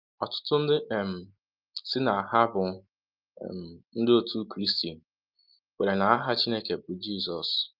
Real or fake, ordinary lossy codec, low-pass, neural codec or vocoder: real; Opus, 32 kbps; 5.4 kHz; none